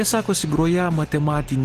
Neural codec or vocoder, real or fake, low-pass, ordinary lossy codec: none; real; 14.4 kHz; Opus, 16 kbps